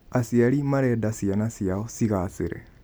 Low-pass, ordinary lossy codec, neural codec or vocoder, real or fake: none; none; none; real